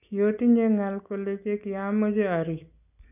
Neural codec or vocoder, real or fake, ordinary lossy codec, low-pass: none; real; none; 3.6 kHz